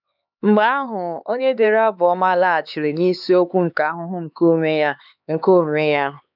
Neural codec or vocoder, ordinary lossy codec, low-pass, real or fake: codec, 16 kHz, 4 kbps, X-Codec, HuBERT features, trained on LibriSpeech; none; 5.4 kHz; fake